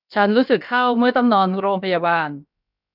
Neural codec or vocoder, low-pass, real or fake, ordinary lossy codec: codec, 16 kHz, about 1 kbps, DyCAST, with the encoder's durations; 5.4 kHz; fake; none